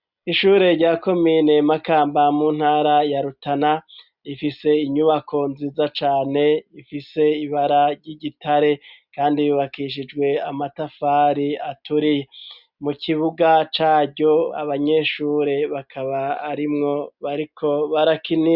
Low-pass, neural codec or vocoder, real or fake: 5.4 kHz; none; real